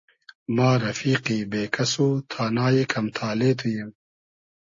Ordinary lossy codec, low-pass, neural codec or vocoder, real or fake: MP3, 32 kbps; 7.2 kHz; none; real